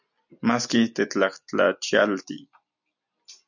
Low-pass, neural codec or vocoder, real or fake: 7.2 kHz; none; real